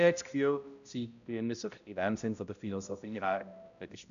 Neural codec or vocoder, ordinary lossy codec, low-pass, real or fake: codec, 16 kHz, 0.5 kbps, X-Codec, HuBERT features, trained on balanced general audio; none; 7.2 kHz; fake